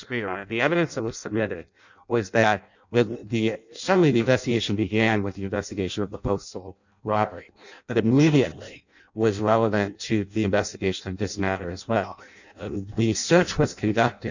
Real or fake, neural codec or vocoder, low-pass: fake; codec, 16 kHz in and 24 kHz out, 0.6 kbps, FireRedTTS-2 codec; 7.2 kHz